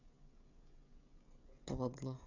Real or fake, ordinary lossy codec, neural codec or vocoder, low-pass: fake; none; codec, 16 kHz, 16 kbps, FreqCodec, smaller model; 7.2 kHz